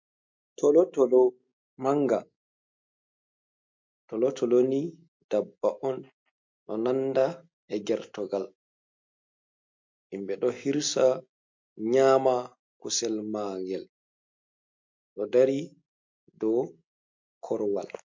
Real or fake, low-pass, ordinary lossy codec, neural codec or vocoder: real; 7.2 kHz; MP3, 48 kbps; none